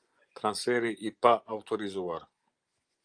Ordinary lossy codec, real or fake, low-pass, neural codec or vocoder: Opus, 32 kbps; fake; 9.9 kHz; vocoder, 24 kHz, 100 mel bands, Vocos